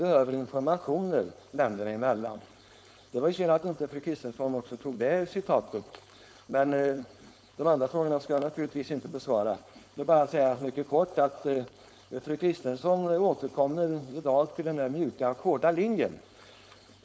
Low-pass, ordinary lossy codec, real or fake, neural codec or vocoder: none; none; fake; codec, 16 kHz, 4.8 kbps, FACodec